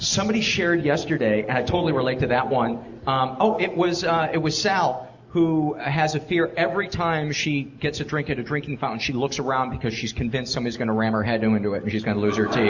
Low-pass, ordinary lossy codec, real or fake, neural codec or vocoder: 7.2 kHz; Opus, 64 kbps; real; none